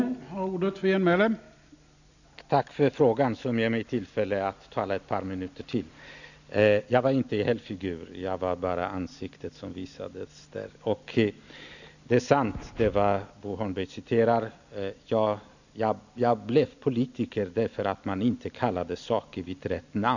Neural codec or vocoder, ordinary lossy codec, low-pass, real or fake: none; none; 7.2 kHz; real